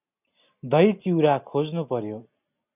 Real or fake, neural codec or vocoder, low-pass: real; none; 3.6 kHz